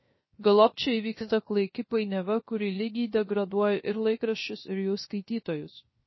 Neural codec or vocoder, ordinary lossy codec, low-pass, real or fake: codec, 16 kHz, 0.3 kbps, FocalCodec; MP3, 24 kbps; 7.2 kHz; fake